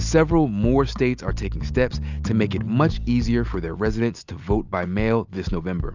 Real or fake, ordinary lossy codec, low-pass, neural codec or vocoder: real; Opus, 64 kbps; 7.2 kHz; none